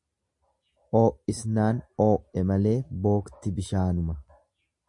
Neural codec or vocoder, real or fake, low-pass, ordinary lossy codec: none; real; 10.8 kHz; MP3, 64 kbps